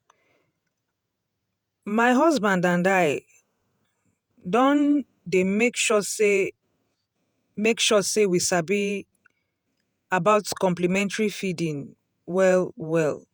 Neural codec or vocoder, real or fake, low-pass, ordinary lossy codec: vocoder, 48 kHz, 128 mel bands, Vocos; fake; none; none